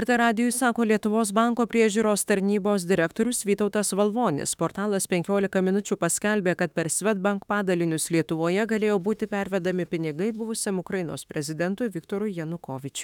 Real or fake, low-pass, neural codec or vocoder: fake; 19.8 kHz; autoencoder, 48 kHz, 32 numbers a frame, DAC-VAE, trained on Japanese speech